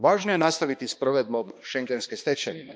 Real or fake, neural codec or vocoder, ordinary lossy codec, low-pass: fake; codec, 16 kHz, 2 kbps, X-Codec, HuBERT features, trained on balanced general audio; none; none